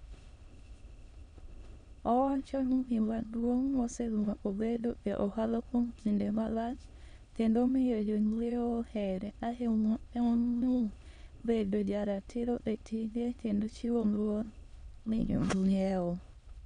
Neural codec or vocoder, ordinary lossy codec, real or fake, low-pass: autoencoder, 22.05 kHz, a latent of 192 numbers a frame, VITS, trained on many speakers; none; fake; 9.9 kHz